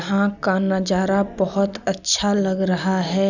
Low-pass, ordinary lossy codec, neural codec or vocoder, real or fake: 7.2 kHz; none; none; real